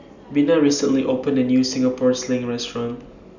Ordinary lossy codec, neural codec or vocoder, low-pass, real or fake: none; none; 7.2 kHz; real